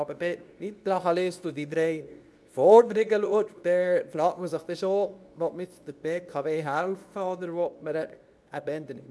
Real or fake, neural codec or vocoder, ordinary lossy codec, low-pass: fake; codec, 24 kHz, 0.9 kbps, WavTokenizer, medium speech release version 2; none; none